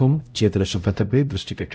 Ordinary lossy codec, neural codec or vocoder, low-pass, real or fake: none; codec, 16 kHz, 0.5 kbps, X-Codec, HuBERT features, trained on LibriSpeech; none; fake